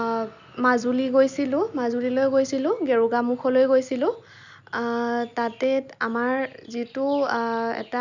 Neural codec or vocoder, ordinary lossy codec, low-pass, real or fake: none; none; 7.2 kHz; real